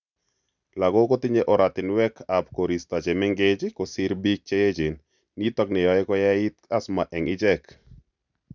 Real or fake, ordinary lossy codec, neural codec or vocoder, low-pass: real; none; none; 7.2 kHz